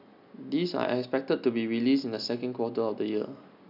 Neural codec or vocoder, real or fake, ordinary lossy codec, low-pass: none; real; none; 5.4 kHz